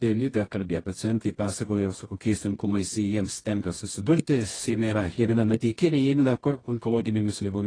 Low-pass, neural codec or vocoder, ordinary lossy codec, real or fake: 9.9 kHz; codec, 24 kHz, 0.9 kbps, WavTokenizer, medium music audio release; AAC, 32 kbps; fake